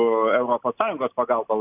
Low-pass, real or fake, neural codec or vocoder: 3.6 kHz; real; none